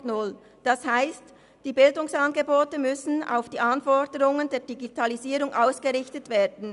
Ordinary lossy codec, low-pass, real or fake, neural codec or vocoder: none; 10.8 kHz; real; none